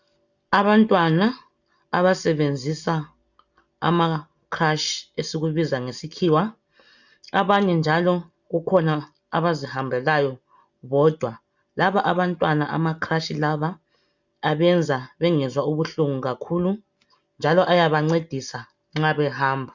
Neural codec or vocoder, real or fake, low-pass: none; real; 7.2 kHz